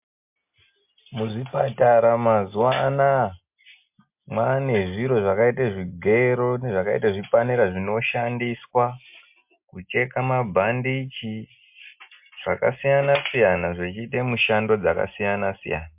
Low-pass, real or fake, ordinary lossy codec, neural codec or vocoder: 3.6 kHz; real; MP3, 32 kbps; none